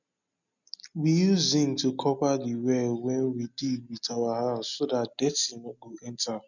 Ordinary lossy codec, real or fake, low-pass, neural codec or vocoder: none; real; 7.2 kHz; none